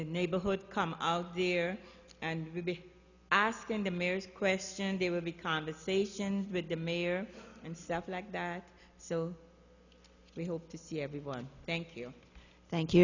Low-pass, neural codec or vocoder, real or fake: 7.2 kHz; none; real